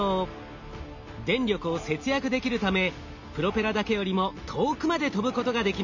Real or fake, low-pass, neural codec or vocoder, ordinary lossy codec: real; 7.2 kHz; none; none